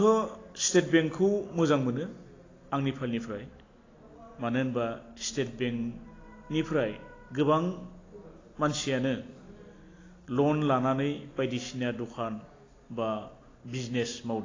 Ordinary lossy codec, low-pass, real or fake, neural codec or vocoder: AAC, 32 kbps; 7.2 kHz; real; none